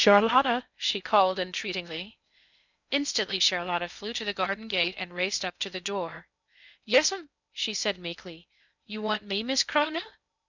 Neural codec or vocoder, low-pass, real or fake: codec, 16 kHz in and 24 kHz out, 0.6 kbps, FocalCodec, streaming, 2048 codes; 7.2 kHz; fake